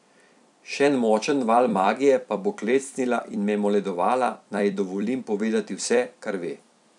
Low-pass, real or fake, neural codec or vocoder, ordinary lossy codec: 10.8 kHz; fake; vocoder, 44.1 kHz, 128 mel bands every 256 samples, BigVGAN v2; none